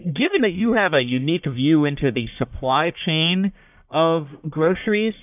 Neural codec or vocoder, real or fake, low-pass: codec, 44.1 kHz, 1.7 kbps, Pupu-Codec; fake; 3.6 kHz